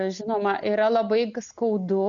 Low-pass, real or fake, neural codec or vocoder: 7.2 kHz; real; none